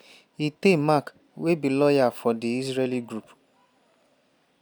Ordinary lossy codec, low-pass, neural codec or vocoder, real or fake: none; none; none; real